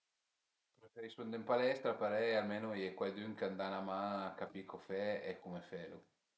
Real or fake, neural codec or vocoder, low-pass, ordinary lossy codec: real; none; none; none